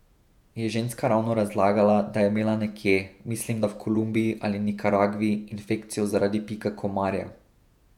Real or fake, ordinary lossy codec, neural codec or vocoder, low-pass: fake; none; vocoder, 48 kHz, 128 mel bands, Vocos; 19.8 kHz